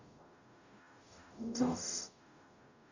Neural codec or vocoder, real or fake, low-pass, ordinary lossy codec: codec, 44.1 kHz, 0.9 kbps, DAC; fake; 7.2 kHz; none